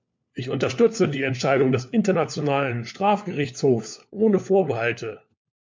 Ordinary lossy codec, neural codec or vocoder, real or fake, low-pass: MP3, 64 kbps; codec, 16 kHz, 4 kbps, FunCodec, trained on LibriTTS, 50 frames a second; fake; 7.2 kHz